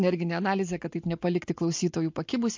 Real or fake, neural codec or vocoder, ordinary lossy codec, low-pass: real; none; MP3, 48 kbps; 7.2 kHz